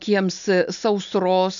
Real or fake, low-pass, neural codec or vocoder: real; 7.2 kHz; none